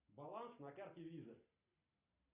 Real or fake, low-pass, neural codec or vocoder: real; 3.6 kHz; none